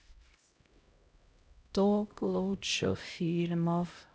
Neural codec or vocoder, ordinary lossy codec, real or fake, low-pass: codec, 16 kHz, 0.5 kbps, X-Codec, HuBERT features, trained on LibriSpeech; none; fake; none